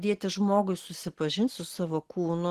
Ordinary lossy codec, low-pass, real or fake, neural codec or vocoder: Opus, 16 kbps; 14.4 kHz; real; none